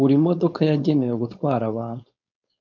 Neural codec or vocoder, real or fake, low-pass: codec, 16 kHz, 4.8 kbps, FACodec; fake; 7.2 kHz